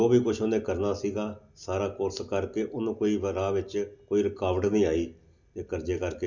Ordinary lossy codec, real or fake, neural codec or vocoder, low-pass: none; real; none; 7.2 kHz